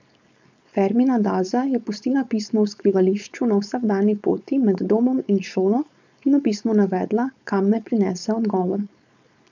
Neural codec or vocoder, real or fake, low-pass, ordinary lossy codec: codec, 16 kHz, 4.8 kbps, FACodec; fake; 7.2 kHz; none